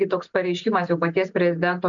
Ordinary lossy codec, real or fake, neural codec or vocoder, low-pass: MP3, 64 kbps; real; none; 7.2 kHz